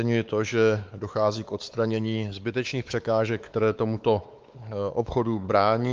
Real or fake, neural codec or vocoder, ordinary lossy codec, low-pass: fake; codec, 16 kHz, 4 kbps, X-Codec, WavLM features, trained on Multilingual LibriSpeech; Opus, 24 kbps; 7.2 kHz